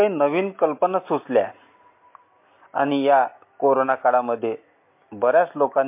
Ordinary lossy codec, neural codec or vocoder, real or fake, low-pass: MP3, 24 kbps; none; real; 3.6 kHz